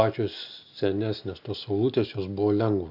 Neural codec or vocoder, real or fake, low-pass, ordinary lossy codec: none; real; 5.4 kHz; AAC, 48 kbps